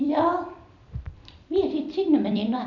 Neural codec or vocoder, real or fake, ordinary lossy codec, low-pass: vocoder, 44.1 kHz, 128 mel bands every 256 samples, BigVGAN v2; fake; none; 7.2 kHz